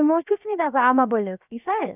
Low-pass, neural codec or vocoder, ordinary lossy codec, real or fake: 3.6 kHz; codec, 16 kHz, about 1 kbps, DyCAST, with the encoder's durations; none; fake